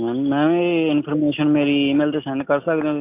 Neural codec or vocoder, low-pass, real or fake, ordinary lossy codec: none; 3.6 kHz; real; AAC, 32 kbps